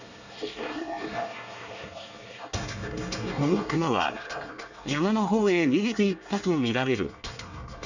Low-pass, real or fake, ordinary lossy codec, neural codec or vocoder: 7.2 kHz; fake; none; codec, 24 kHz, 1 kbps, SNAC